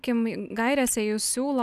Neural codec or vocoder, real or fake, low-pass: none; real; 14.4 kHz